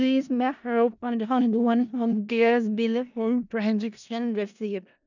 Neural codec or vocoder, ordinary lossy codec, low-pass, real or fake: codec, 16 kHz in and 24 kHz out, 0.4 kbps, LongCat-Audio-Codec, four codebook decoder; none; 7.2 kHz; fake